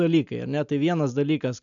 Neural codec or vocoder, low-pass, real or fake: none; 7.2 kHz; real